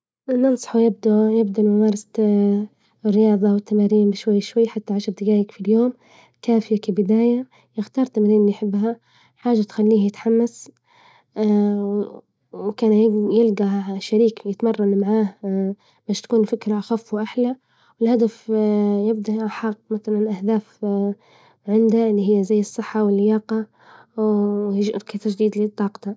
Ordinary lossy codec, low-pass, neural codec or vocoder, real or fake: none; none; none; real